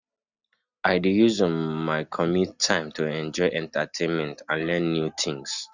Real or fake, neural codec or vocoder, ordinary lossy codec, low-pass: real; none; none; 7.2 kHz